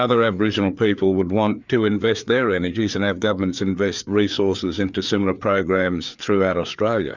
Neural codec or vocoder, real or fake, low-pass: codec, 16 kHz, 4 kbps, FreqCodec, larger model; fake; 7.2 kHz